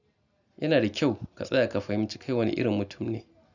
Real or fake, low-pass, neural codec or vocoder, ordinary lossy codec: real; 7.2 kHz; none; none